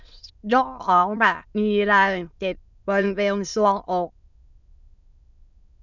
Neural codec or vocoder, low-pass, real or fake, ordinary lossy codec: autoencoder, 22.05 kHz, a latent of 192 numbers a frame, VITS, trained on many speakers; 7.2 kHz; fake; none